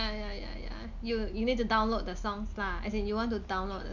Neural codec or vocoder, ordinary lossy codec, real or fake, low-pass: none; none; real; 7.2 kHz